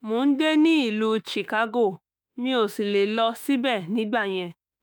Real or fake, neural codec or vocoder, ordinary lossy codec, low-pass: fake; autoencoder, 48 kHz, 32 numbers a frame, DAC-VAE, trained on Japanese speech; none; none